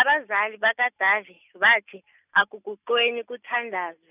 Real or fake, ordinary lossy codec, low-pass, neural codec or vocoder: real; none; 3.6 kHz; none